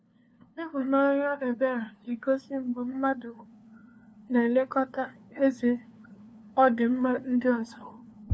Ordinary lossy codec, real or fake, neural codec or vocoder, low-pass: none; fake; codec, 16 kHz, 2 kbps, FunCodec, trained on LibriTTS, 25 frames a second; none